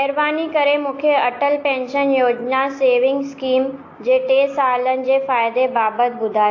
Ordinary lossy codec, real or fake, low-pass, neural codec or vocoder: AAC, 48 kbps; real; 7.2 kHz; none